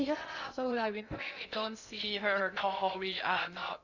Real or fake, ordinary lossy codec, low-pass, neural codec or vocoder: fake; none; 7.2 kHz; codec, 16 kHz in and 24 kHz out, 0.6 kbps, FocalCodec, streaming, 2048 codes